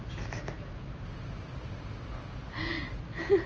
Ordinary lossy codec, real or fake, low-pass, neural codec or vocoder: Opus, 24 kbps; real; 7.2 kHz; none